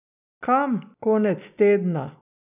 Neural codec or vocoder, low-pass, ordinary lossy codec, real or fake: none; 3.6 kHz; none; real